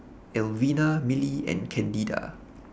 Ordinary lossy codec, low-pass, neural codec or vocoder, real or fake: none; none; none; real